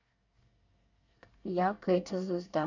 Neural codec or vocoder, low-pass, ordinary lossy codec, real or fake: codec, 24 kHz, 1 kbps, SNAC; 7.2 kHz; none; fake